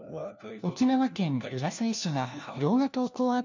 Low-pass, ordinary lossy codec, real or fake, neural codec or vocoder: 7.2 kHz; none; fake; codec, 16 kHz, 1 kbps, FunCodec, trained on LibriTTS, 50 frames a second